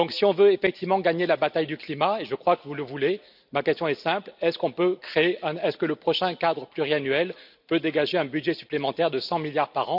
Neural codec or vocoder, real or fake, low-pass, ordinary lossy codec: none; real; 5.4 kHz; none